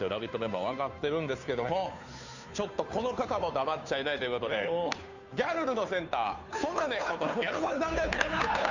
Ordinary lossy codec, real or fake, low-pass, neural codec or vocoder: none; fake; 7.2 kHz; codec, 16 kHz, 2 kbps, FunCodec, trained on Chinese and English, 25 frames a second